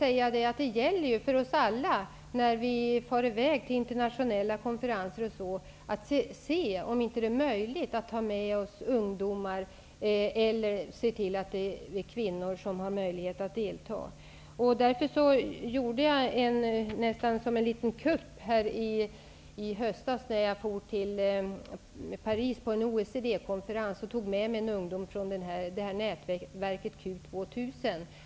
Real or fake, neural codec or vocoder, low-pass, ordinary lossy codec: real; none; none; none